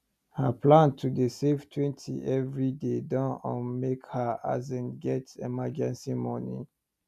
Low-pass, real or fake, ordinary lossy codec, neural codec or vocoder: 14.4 kHz; real; none; none